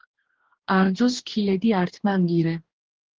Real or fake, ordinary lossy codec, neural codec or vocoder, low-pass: fake; Opus, 16 kbps; codec, 44.1 kHz, 2.6 kbps, DAC; 7.2 kHz